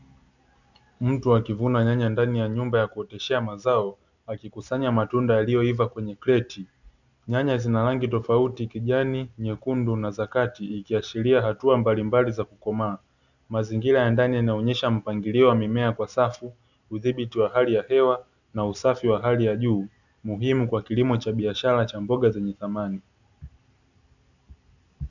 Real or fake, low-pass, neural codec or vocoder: real; 7.2 kHz; none